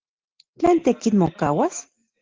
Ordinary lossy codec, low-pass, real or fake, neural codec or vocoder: Opus, 16 kbps; 7.2 kHz; real; none